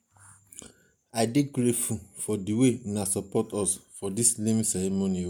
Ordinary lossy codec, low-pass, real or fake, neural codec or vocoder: MP3, 96 kbps; 19.8 kHz; real; none